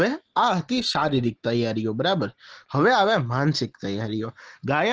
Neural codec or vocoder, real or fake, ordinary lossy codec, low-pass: none; real; Opus, 16 kbps; 7.2 kHz